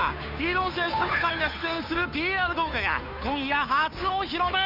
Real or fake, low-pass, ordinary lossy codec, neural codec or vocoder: fake; 5.4 kHz; none; codec, 16 kHz, 2 kbps, FunCodec, trained on Chinese and English, 25 frames a second